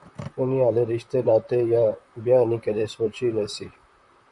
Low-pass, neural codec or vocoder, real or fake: 10.8 kHz; vocoder, 44.1 kHz, 128 mel bands, Pupu-Vocoder; fake